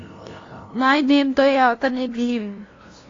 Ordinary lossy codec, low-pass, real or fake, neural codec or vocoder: AAC, 32 kbps; 7.2 kHz; fake; codec, 16 kHz, 0.5 kbps, FunCodec, trained on LibriTTS, 25 frames a second